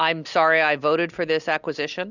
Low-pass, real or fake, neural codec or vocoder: 7.2 kHz; real; none